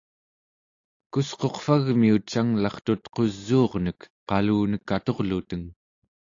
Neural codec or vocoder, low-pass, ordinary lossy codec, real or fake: none; 7.2 kHz; AAC, 48 kbps; real